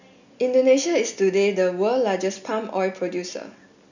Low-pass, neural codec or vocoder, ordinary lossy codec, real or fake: 7.2 kHz; none; none; real